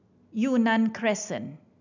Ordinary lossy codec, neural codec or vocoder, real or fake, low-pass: none; none; real; 7.2 kHz